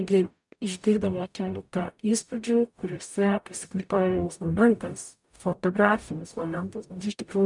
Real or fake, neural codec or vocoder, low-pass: fake; codec, 44.1 kHz, 0.9 kbps, DAC; 10.8 kHz